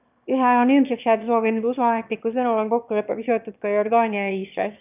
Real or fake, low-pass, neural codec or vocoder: fake; 3.6 kHz; autoencoder, 22.05 kHz, a latent of 192 numbers a frame, VITS, trained on one speaker